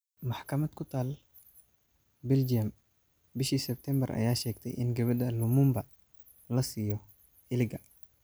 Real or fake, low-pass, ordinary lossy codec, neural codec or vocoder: real; none; none; none